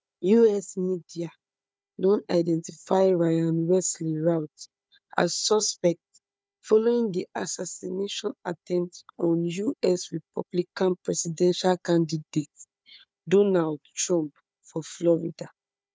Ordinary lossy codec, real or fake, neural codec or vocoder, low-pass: none; fake; codec, 16 kHz, 4 kbps, FunCodec, trained on Chinese and English, 50 frames a second; none